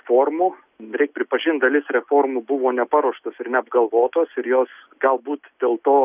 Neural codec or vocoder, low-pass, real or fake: none; 3.6 kHz; real